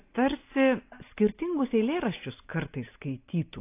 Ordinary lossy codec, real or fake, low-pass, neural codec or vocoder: AAC, 24 kbps; real; 3.6 kHz; none